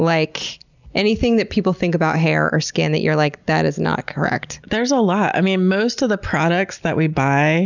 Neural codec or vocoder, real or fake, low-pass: none; real; 7.2 kHz